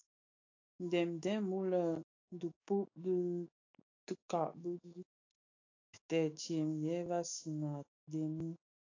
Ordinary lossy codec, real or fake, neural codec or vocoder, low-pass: AAC, 32 kbps; fake; autoencoder, 48 kHz, 128 numbers a frame, DAC-VAE, trained on Japanese speech; 7.2 kHz